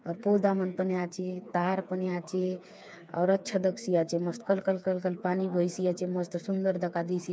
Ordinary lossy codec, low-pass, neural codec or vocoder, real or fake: none; none; codec, 16 kHz, 4 kbps, FreqCodec, smaller model; fake